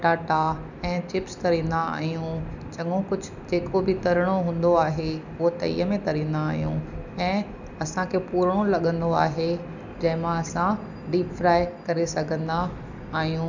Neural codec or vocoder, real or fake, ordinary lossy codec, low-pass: none; real; none; 7.2 kHz